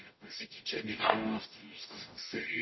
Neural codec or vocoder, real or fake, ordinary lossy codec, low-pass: codec, 44.1 kHz, 0.9 kbps, DAC; fake; MP3, 24 kbps; 7.2 kHz